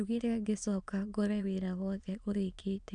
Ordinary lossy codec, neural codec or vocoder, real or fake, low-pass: none; autoencoder, 22.05 kHz, a latent of 192 numbers a frame, VITS, trained on many speakers; fake; 9.9 kHz